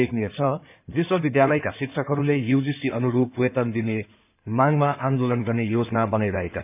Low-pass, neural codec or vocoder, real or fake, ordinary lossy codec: 3.6 kHz; codec, 16 kHz in and 24 kHz out, 2.2 kbps, FireRedTTS-2 codec; fake; none